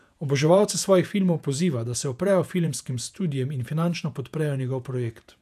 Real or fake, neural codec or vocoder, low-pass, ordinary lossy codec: fake; vocoder, 48 kHz, 128 mel bands, Vocos; 14.4 kHz; none